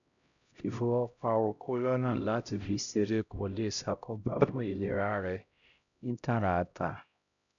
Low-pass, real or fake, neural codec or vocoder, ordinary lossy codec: 7.2 kHz; fake; codec, 16 kHz, 0.5 kbps, X-Codec, HuBERT features, trained on LibriSpeech; none